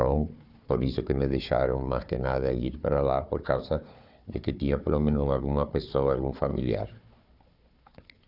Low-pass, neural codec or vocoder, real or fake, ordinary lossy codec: 5.4 kHz; codec, 16 kHz, 4 kbps, FunCodec, trained on Chinese and English, 50 frames a second; fake; none